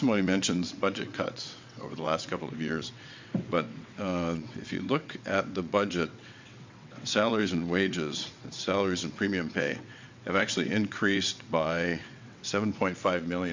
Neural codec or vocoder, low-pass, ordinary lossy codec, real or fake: vocoder, 22.05 kHz, 80 mel bands, WaveNeXt; 7.2 kHz; MP3, 64 kbps; fake